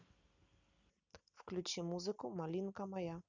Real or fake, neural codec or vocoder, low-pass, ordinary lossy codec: real; none; 7.2 kHz; none